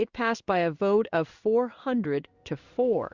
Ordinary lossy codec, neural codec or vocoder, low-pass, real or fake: Opus, 64 kbps; codec, 16 kHz in and 24 kHz out, 1 kbps, XY-Tokenizer; 7.2 kHz; fake